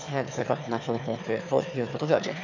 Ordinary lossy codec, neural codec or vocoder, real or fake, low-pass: none; autoencoder, 22.05 kHz, a latent of 192 numbers a frame, VITS, trained on one speaker; fake; 7.2 kHz